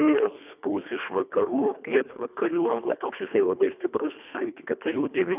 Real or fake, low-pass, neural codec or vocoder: fake; 3.6 kHz; codec, 24 kHz, 1.5 kbps, HILCodec